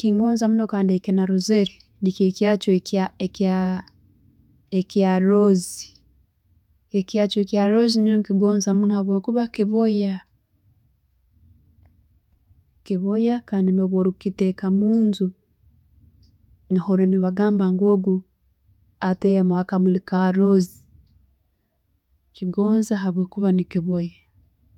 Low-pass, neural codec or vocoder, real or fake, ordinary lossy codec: 19.8 kHz; vocoder, 48 kHz, 128 mel bands, Vocos; fake; none